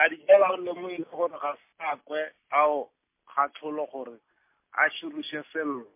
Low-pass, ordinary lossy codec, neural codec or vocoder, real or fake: 3.6 kHz; MP3, 24 kbps; none; real